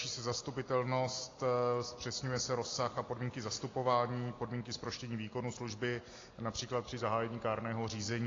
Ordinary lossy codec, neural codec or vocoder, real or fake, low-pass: AAC, 32 kbps; none; real; 7.2 kHz